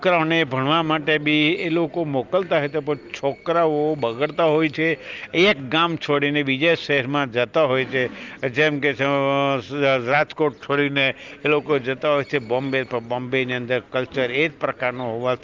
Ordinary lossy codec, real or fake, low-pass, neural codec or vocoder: Opus, 24 kbps; real; 7.2 kHz; none